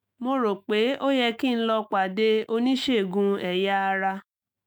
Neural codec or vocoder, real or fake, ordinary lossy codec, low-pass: autoencoder, 48 kHz, 128 numbers a frame, DAC-VAE, trained on Japanese speech; fake; none; none